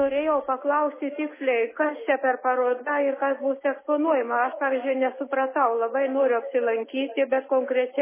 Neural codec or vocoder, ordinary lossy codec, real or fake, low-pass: none; MP3, 16 kbps; real; 3.6 kHz